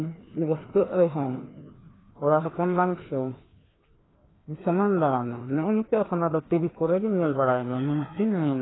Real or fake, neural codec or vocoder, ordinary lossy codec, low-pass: fake; codec, 16 kHz, 2 kbps, FreqCodec, larger model; AAC, 16 kbps; 7.2 kHz